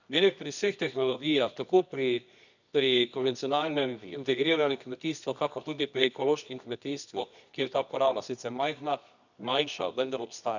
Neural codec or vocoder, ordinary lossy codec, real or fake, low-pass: codec, 24 kHz, 0.9 kbps, WavTokenizer, medium music audio release; none; fake; 7.2 kHz